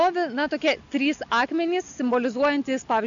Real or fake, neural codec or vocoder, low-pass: real; none; 7.2 kHz